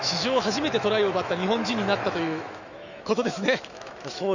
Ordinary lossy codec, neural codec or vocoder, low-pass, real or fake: none; autoencoder, 48 kHz, 128 numbers a frame, DAC-VAE, trained on Japanese speech; 7.2 kHz; fake